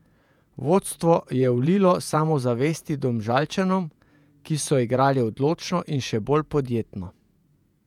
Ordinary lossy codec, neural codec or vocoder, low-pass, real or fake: none; vocoder, 48 kHz, 128 mel bands, Vocos; 19.8 kHz; fake